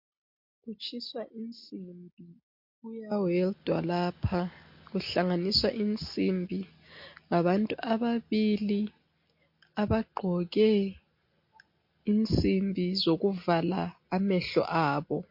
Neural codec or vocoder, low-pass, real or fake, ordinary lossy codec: none; 5.4 kHz; real; MP3, 32 kbps